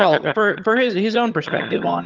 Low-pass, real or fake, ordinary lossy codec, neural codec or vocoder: 7.2 kHz; fake; Opus, 32 kbps; vocoder, 22.05 kHz, 80 mel bands, HiFi-GAN